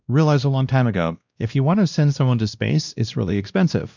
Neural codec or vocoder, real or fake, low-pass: codec, 16 kHz, 1 kbps, X-Codec, WavLM features, trained on Multilingual LibriSpeech; fake; 7.2 kHz